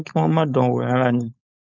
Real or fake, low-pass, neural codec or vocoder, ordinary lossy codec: fake; 7.2 kHz; codec, 16 kHz, 4.8 kbps, FACodec; none